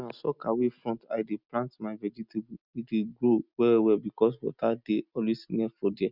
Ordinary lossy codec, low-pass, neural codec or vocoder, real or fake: none; 5.4 kHz; none; real